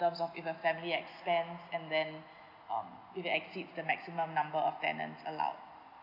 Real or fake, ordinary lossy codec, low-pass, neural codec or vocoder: real; none; 5.4 kHz; none